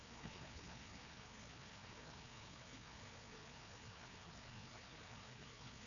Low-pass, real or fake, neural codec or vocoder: 7.2 kHz; fake; codec, 16 kHz, 2 kbps, FreqCodec, larger model